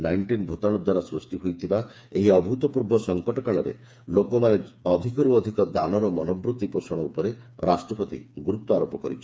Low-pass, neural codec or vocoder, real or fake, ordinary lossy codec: none; codec, 16 kHz, 4 kbps, FreqCodec, smaller model; fake; none